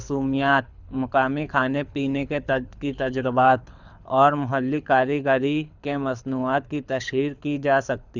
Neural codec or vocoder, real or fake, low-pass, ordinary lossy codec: codec, 24 kHz, 6 kbps, HILCodec; fake; 7.2 kHz; none